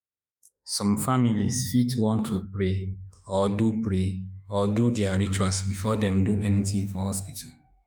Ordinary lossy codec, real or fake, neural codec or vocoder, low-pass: none; fake; autoencoder, 48 kHz, 32 numbers a frame, DAC-VAE, trained on Japanese speech; none